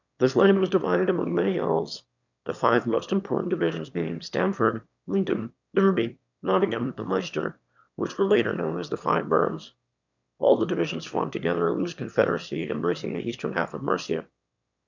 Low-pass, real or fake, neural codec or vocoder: 7.2 kHz; fake; autoencoder, 22.05 kHz, a latent of 192 numbers a frame, VITS, trained on one speaker